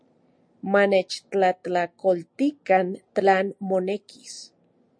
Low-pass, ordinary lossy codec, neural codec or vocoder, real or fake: 9.9 kHz; MP3, 48 kbps; none; real